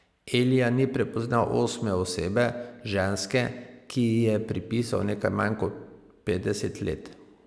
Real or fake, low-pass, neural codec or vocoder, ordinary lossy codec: real; none; none; none